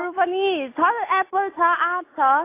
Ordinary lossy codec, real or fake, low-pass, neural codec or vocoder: AAC, 24 kbps; real; 3.6 kHz; none